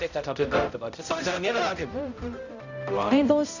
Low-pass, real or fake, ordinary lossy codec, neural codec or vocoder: 7.2 kHz; fake; none; codec, 16 kHz, 0.5 kbps, X-Codec, HuBERT features, trained on balanced general audio